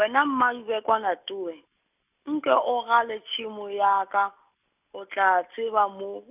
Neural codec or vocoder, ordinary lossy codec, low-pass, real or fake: none; none; 3.6 kHz; real